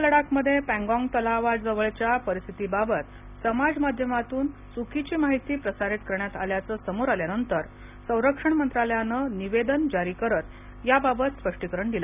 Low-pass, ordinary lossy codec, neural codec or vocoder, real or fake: 3.6 kHz; none; none; real